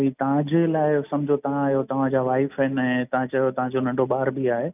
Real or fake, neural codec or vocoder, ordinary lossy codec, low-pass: real; none; none; 3.6 kHz